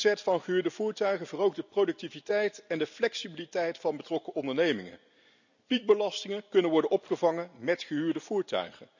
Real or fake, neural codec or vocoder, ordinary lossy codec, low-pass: real; none; none; 7.2 kHz